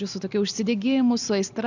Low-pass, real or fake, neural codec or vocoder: 7.2 kHz; real; none